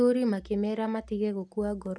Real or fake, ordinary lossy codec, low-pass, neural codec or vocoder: real; none; none; none